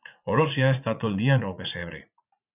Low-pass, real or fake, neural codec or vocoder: 3.6 kHz; fake; vocoder, 44.1 kHz, 80 mel bands, Vocos